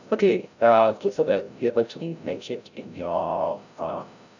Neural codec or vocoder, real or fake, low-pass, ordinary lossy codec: codec, 16 kHz, 0.5 kbps, FreqCodec, larger model; fake; 7.2 kHz; none